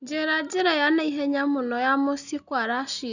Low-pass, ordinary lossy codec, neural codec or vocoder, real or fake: 7.2 kHz; none; none; real